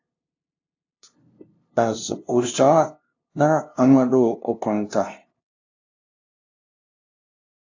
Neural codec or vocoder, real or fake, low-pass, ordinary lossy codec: codec, 16 kHz, 0.5 kbps, FunCodec, trained on LibriTTS, 25 frames a second; fake; 7.2 kHz; AAC, 48 kbps